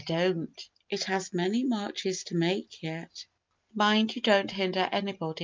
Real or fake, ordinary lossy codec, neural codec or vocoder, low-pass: real; Opus, 24 kbps; none; 7.2 kHz